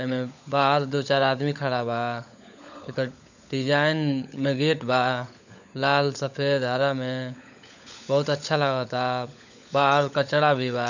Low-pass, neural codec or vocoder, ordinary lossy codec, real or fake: 7.2 kHz; codec, 16 kHz, 16 kbps, FunCodec, trained on LibriTTS, 50 frames a second; none; fake